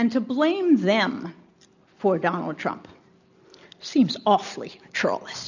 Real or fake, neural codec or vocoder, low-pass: real; none; 7.2 kHz